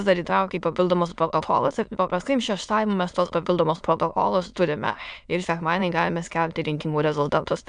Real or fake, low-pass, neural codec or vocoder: fake; 9.9 kHz; autoencoder, 22.05 kHz, a latent of 192 numbers a frame, VITS, trained on many speakers